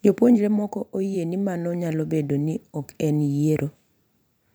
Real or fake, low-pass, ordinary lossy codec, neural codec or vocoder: fake; none; none; vocoder, 44.1 kHz, 128 mel bands every 512 samples, BigVGAN v2